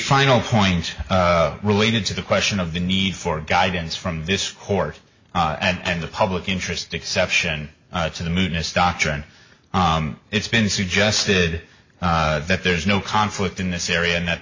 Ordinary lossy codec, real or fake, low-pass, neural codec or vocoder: MP3, 32 kbps; real; 7.2 kHz; none